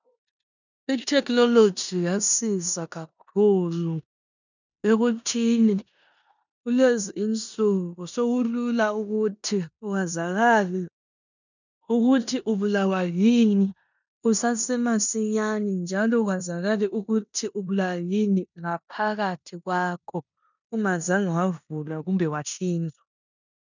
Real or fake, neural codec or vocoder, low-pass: fake; codec, 16 kHz in and 24 kHz out, 0.9 kbps, LongCat-Audio-Codec, four codebook decoder; 7.2 kHz